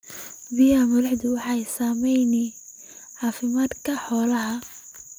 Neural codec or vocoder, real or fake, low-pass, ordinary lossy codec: none; real; none; none